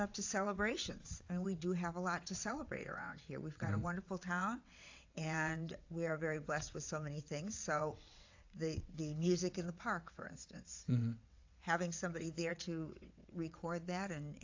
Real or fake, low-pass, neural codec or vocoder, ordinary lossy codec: fake; 7.2 kHz; vocoder, 22.05 kHz, 80 mel bands, WaveNeXt; AAC, 48 kbps